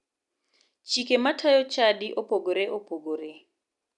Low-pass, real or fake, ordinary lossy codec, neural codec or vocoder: 10.8 kHz; real; none; none